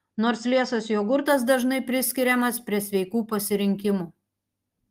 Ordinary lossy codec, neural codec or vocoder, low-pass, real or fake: Opus, 24 kbps; none; 14.4 kHz; real